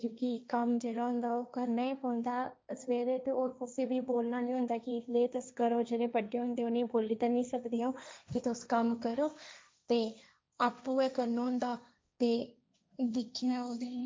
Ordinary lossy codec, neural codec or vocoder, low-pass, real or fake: none; codec, 16 kHz, 1.1 kbps, Voila-Tokenizer; none; fake